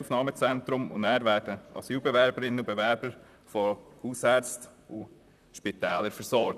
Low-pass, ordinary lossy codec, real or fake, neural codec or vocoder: 14.4 kHz; none; fake; vocoder, 44.1 kHz, 128 mel bands, Pupu-Vocoder